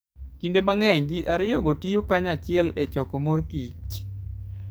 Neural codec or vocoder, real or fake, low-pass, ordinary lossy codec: codec, 44.1 kHz, 2.6 kbps, SNAC; fake; none; none